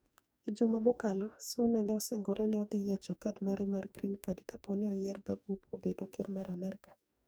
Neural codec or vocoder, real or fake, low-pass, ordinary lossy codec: codec, 44.1 kHz, 2.6 kbps, DAC; fake; none; none